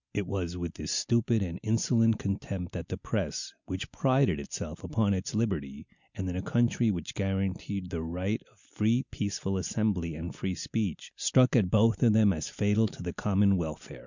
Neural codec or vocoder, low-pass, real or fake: none; 7.2 kHz; real